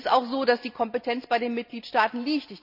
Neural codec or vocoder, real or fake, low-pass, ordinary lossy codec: none; real; 5.4 kHz; none